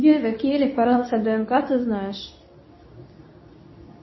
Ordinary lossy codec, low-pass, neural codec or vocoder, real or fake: MP3, 24 kbps; 7.2 kHz; codec, 24 kHz, 0.9 kbps, WavTokenizer, medium speech release version 2; fake